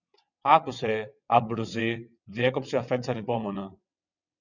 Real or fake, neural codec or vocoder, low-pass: fake; vocoder, 22.05 kHz, 80 mel bands, WaveNeXt; 7.2 kHz